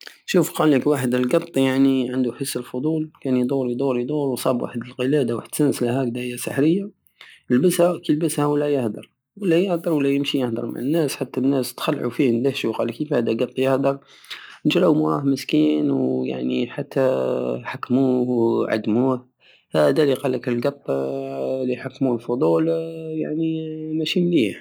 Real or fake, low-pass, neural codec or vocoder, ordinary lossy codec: real; none; none; none